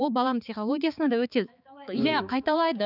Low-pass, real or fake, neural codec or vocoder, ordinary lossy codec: 5.4 kHz; fake; codec, 16 kHz, 4 kbps, X-Codec, HuBERT features, trained on balanced general audio; none